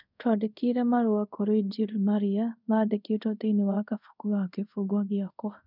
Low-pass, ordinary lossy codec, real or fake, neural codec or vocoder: 5.4 kHz; none; fake; codec, 24 kHz, 0.5 kbps, DualCodec